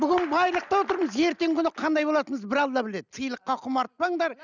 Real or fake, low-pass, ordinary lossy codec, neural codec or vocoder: real; 7.2 kHz; none; none